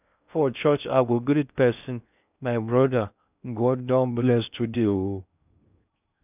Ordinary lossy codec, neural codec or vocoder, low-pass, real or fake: none; codec, 16 kHz in and 24 kHz out, 0.6 kbps, FocalCodec, streaming, 4096 codes; 3.6 kHz; fake